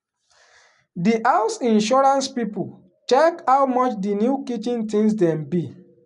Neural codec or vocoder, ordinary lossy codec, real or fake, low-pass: none; none; real; 10.8 kHz